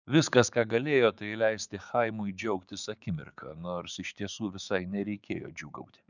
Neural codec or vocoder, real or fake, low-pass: codec, 16 kHz, 6 kbps, DAC; fake; 7.2 kHz